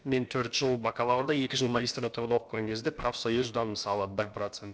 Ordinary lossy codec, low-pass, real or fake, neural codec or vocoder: none; none; fake; codec, 16 kHz, about 1 kbps, DyCAST, with the encoder's durations